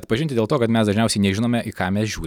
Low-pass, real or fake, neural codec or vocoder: 19.8 kHz; real; none